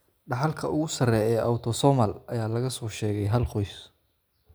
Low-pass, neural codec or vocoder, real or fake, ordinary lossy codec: none; none; real; none